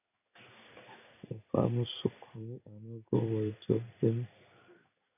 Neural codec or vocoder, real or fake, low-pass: codec, 16 kHz in and 24 kHz out, 1 kbps, XY-Tokenizer; fake; 3.6 kHz